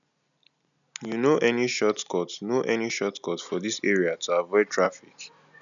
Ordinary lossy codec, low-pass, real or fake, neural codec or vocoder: none; 7.2 kHz; real; none